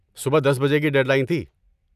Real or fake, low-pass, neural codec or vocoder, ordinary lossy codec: real; 14.4 kHz; none; none